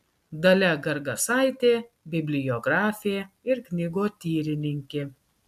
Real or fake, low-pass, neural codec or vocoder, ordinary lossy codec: real; 14.4 kHz; none; AAC, 96 kbps